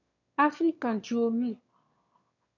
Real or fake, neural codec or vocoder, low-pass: fake; autoencoder, 22.05 kHz, a latent of 192 numbers a frame, VITS, trained on one speaker; 7.2 kHz